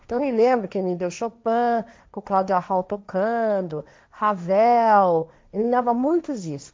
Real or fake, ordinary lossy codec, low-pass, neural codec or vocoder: fake; none; none; codec, 16 kHz, 1.1 kbps, Voila-Tokenizer